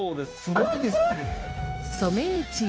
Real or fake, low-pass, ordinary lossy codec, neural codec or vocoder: fake; none; none; codec, 16 kHz, 0.9 kbps, LongCat-Audio-Codec